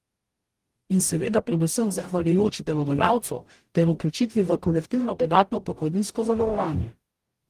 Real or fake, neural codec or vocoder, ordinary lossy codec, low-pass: fake; codec, 44.1 kHz, 0.9 kbps, DAC; Opus, 24 kbps; 14.4 kHz